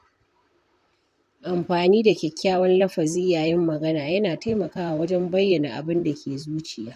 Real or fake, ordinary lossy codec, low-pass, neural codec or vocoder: fake; none; 14.4 kHz; vocoder, 44.1 kHz, 128 mel bands, Pupu-Vocoder